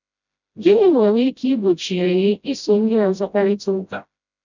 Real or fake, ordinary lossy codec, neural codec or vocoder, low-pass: fake; none; codec, 16 kHz, 0.5 kbps, FreqCodec, smaller model; 7.2 kHz